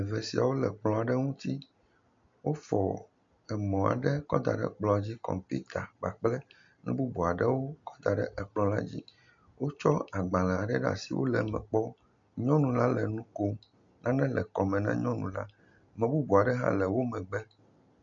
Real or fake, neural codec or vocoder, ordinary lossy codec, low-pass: real; none; MP3, 48 kbps; 7.2 kHz